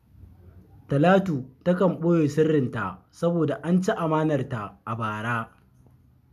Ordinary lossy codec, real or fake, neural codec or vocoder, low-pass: none; real; none; 14.4 kHz